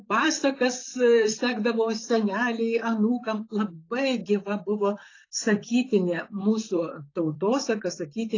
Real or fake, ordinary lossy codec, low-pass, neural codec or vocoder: fake; AAC, 32 kbps; 7.2 kHz; vocoder, 44.1 kHz, 128 mel bands, Pupu-Vocoder